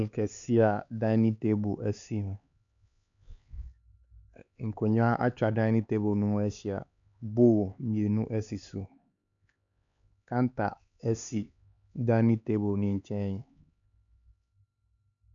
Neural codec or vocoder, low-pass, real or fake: codec, 16 kHz, 4 kbps, X-Codec, HuBERT features, trained on LibriSpeech; 7.2 kHz; fake